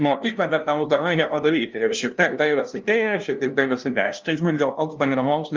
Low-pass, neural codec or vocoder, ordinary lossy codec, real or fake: 7.2 kHz; codec, 16 kHz, 0.5 kbps, FunCodec, trained on LibriTTS, 25 frames a second; Opus, 32 kbps; fake